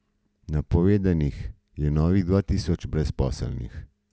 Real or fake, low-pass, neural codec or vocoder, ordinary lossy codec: real; none; none; none